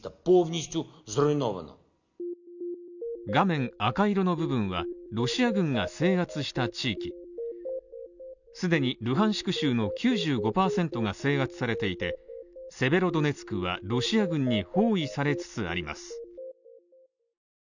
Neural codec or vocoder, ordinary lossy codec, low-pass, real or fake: none; none; 7.2 kHz; real